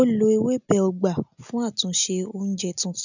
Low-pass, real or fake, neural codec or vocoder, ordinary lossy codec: 7.2 kHz; real; none; none